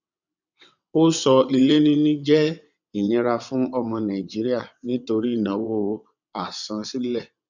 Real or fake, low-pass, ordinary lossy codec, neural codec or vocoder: fake; 7.2 kHz; none; vocoder, 22.05 kHz, 80 mel bands, WaveNeXt